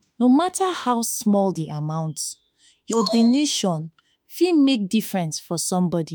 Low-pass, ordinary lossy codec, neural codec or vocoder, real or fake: none; none; autoencoder, 48 kHz, 32 numbers a frame, DAC-VAE, trained on Japanese speech; fake